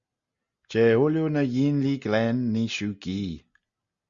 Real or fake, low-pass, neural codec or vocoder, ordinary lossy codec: real; 7.2 kHz; none; Opus, 64 kbps